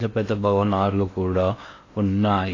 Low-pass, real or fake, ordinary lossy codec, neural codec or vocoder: 7.2 kHz; fake; AAC, 32 kbps; codec, 16 kHz in and 24 kHz out, 0.8 kbps, FocalCodec, streaming, 65536 codes